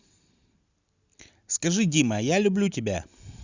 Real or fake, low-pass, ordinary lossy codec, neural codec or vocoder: real; 7.2 kHz; Opus, 64 kbps; none